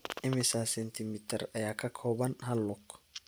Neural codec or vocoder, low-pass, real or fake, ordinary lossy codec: none; none; real; none